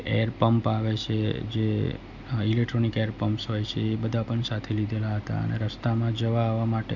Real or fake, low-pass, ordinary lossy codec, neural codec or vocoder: real; 7.2 kHz; none; none